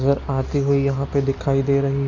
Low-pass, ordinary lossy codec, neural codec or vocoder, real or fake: 7.2 kHz; none; none; real